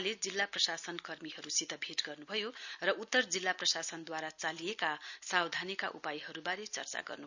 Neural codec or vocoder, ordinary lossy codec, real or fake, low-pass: none; none; real; 7.2 kHz